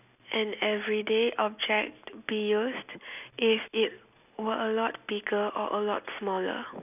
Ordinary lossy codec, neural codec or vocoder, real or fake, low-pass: none; none; real; 3.6 kHz